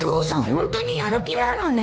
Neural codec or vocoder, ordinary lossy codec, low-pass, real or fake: codec, 16 kHz, 2 kbps, X-Codec, HuBERT features, trained on LibriSpeech; none; none; fake